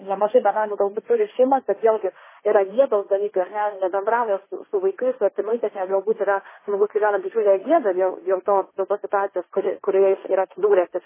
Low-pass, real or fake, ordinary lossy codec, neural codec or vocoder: 3.6 kHz; fake; MP3, 16 kbps; codec, 16 kHz, 1.1 kbps, Voila-Tokenizer